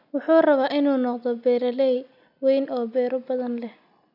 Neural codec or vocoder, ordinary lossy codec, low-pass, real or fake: none; none; 5.4 kHz; real